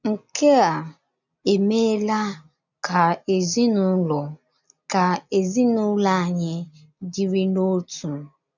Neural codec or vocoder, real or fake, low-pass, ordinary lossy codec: none; real; 7.2 kHz; none